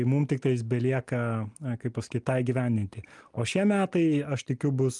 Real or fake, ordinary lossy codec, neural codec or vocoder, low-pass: real; Opus, 32 kbps; none; 10.8 kHz